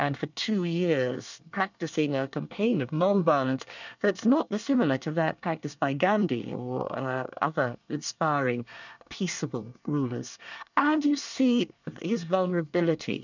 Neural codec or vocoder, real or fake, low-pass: codec, 24 kHz, 1 kbps, SNAC; fake; 7.2 kHz